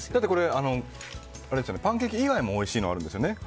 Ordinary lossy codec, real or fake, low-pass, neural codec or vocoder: none; real; none; none